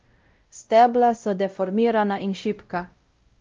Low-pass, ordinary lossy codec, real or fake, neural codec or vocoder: 7.2 kHz; Opus, 32 kbps; fake; codec, 16 kHz, 0.5 kbps, X-Codec, WavLM features, trained on Multilingual LibriSpeech